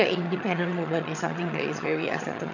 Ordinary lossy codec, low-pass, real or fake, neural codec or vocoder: none; 7.2 kHz; fake; vocoder, 22.05 kHz, 80 mel bands, HiFi-GAN